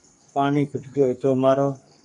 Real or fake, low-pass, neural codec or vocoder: fake; 10.8 kHz; codec, 44.1 kHz, 2.6 kbps, SNAC